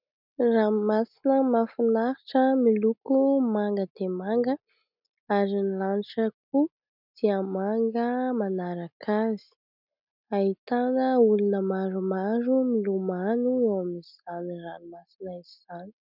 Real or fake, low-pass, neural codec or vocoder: real; 5.4 kHz; none